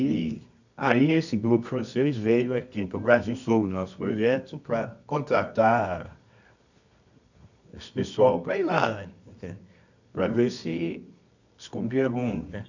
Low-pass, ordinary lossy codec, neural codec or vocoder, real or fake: 7.2 kHz; none; codec, 24 kHz, 0.9 kbps, WavTokenizer, medium music audio release; fake